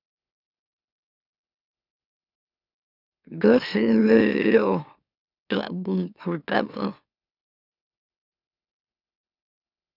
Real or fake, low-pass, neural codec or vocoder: fake; 5.4 kHz; autoencoder, 44.1 kHz, a latent of 192 numbers a frame, MeloTTS